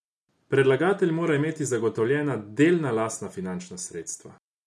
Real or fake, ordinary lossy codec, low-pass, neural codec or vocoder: real; none; none; none